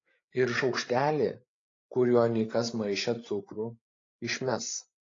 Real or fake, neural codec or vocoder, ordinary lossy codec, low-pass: fake; codec, 16 kHz, 8 kbps, FreqCodec, larger model; AAC, 32 kbps; 7.2 kHz